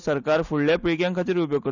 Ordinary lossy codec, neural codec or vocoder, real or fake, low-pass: none; none; real; 7.2 kHz